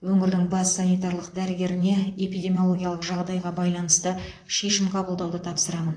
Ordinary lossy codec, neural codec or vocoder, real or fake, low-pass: AAC, 48 kbps; vocoder, 44.1 kHz, 128 mel bands, Pupu-Vocoder; fake; 9.9 kHz